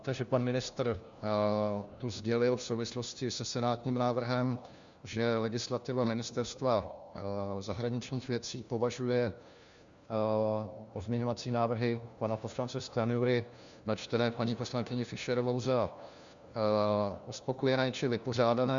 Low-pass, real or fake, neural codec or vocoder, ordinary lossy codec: 7.2 kHz; fake; codec, 16 kHz, 1 kbps, FunCodec, trained on LibriTTS, 50 frames a second; Opus, 64 kbps